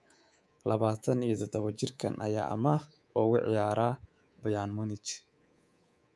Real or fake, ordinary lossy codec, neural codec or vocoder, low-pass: fake; none; codec, 24 kHz, 3.1 kbps, DualCodec; none